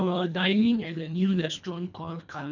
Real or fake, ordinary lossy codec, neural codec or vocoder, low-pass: fake; none; codec, 24 kHz, 1.5 kbps, HILCodec; 7.2 kHz